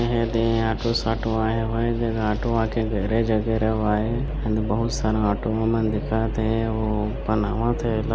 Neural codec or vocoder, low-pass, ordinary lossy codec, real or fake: none; none; none; real